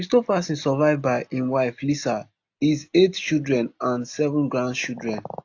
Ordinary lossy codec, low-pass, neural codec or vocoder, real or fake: AAC, 48 kbps; 7.2 kHz; none; real